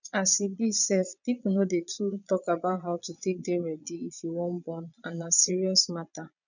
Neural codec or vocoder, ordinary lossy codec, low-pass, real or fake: vocoder, 22.05 kHz, 80 mel bands, Vocos; none; 7.2 kHz; fake